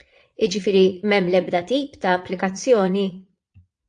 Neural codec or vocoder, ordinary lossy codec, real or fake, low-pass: vocoder, 22.05 kHz, 80 mel bands, Vocos; AAC, 64 kbps; fake; 9.9 kHz